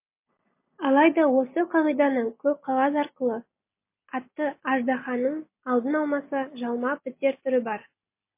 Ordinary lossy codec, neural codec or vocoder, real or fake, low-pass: MP3, 24 kbps; none; real; 3.6 kHz